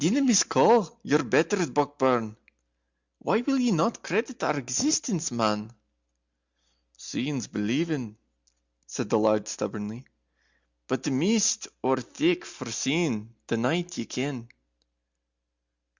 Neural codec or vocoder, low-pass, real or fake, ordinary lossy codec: none; 7.2 kHz; real; Opus, 64 kbps